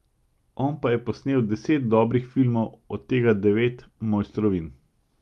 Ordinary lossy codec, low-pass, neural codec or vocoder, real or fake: Opus, 32 kbps; 19.8 kHz; none; real